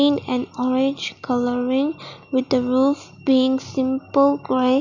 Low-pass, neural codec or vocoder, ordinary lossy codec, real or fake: 7.2 kHz; none; none; real